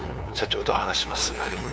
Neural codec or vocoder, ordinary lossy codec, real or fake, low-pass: codec, 16 kHz, 2 kbps, FunCodec, trained on LibriTTS, 25 frames a second; none; fake; none